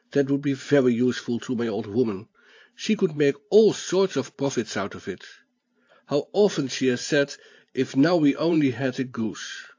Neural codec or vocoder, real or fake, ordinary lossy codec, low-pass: vocoder, 44.1 kHz, 128 mel bands every 512 samples, BigVGAN v2; fake; AAC, 48 kbps; 7.2 kHz